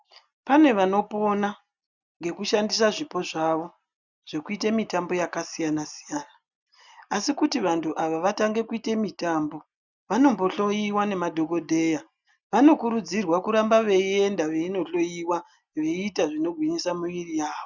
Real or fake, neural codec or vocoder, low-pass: real; none; 7.2 kHz